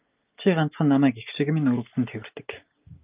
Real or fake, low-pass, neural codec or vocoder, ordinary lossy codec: real; 3.6 kHz; none; Opus, 24 kbps